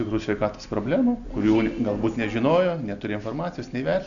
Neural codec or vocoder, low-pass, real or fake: none; 7.2 kHz; real